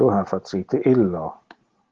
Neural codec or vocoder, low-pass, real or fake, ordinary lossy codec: none; 7.2 kHz; real; Opus, 16 kbps